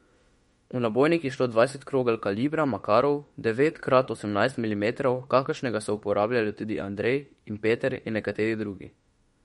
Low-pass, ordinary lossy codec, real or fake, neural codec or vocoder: 19.8 kHz; MP3, 48 kbps; fake; autoencoder, 48 kHz, 32 numbers a frame, DAC-VAE, trained on Japanese speech